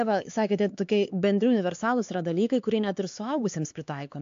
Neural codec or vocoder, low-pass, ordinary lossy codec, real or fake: codec, 16 kHz, 4 kbps, X-Codec, HuBERT features, trained on LibriSpeech; 7.2 kHz; AAC, 48 kbps; fake